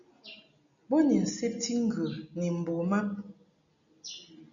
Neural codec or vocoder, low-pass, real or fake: none; 7.2 kHz; real